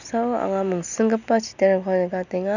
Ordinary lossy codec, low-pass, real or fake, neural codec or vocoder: none; 7.2 kHz; real; none